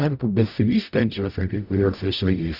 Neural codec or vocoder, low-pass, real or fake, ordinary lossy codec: codec, 44.1 kHz, 0.9 kbps, DAC; 5.4 kHz; fake; Opus, 64 kbps